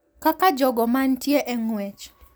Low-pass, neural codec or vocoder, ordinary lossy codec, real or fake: none; none; none; real